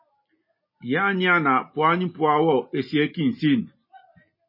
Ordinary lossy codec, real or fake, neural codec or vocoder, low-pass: MP3, 24 kbps; real; none; 5.4 kHz